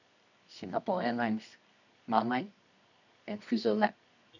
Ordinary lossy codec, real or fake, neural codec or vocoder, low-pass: none; fake; codec, 24 kHz, 0.9 kbps, WavTokenizer, medium music audio release; 7.2 kHz